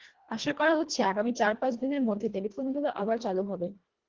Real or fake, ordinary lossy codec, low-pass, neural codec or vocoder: fake; Opus, 16 kbps; 7.2 kHz; codec, 24 kHz, 1.5 kbps, HILCodec